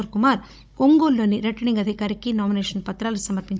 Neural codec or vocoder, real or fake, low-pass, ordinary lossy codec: codec, 16 kHz, 16 kbps, FunCodec, trained on Chinese and English, 50 frames a second; fake; none; none